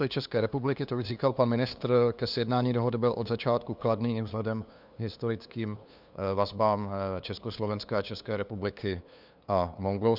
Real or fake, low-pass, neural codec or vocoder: fake; 5.4 kHz; codec, 16 kHz, 2 kbps, FunCodec, trained on LibriTTS, 25 frames a second